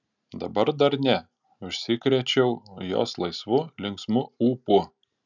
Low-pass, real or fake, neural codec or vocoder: 7.2 kHz; real; none